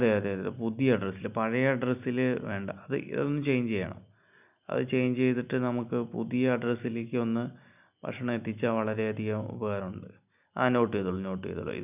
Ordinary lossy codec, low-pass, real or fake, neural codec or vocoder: none; 3.6 kHz; real; none